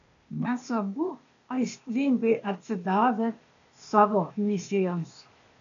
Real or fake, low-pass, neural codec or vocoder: fake; 7.2 kHz; codec, 16 kHz, 0.8 kbps, ZipCodec